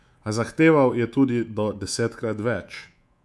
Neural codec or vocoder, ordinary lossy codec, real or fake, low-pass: codec, 24 kHz, 3.1 kbps, DualCodec; none; fake; none